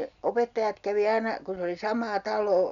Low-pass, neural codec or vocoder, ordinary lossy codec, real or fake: 7.2 kHz; none; none; real